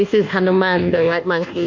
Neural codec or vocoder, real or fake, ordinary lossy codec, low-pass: codec, 24 kHz, 1.2 kbps, DualCodec; fake; MP3, 64 kbps; 7.2 kHz